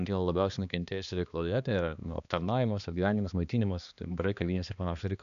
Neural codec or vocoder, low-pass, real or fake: codec, 16 kHz, 2 kbps, X-Codec, HuBERT features, trained on balanced general audio; 7.2 kHz; fake